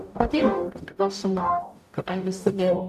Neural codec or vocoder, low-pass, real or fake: codec, 44.1 kHz, 0.9 kbps, DAC; 14.4 kHz; fake